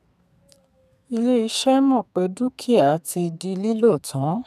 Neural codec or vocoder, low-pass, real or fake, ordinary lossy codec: codec, 32 kHz, 1.9 kbps, SNAC; 14.4 kHz; fake; none